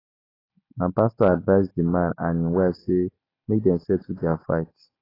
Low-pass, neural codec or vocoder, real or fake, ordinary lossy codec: 5.4 kHz; none; real; AAC, 24 kbps